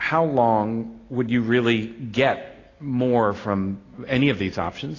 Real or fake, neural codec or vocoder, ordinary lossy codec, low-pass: real; none; AAC, 32 kbps; 7.2 kHz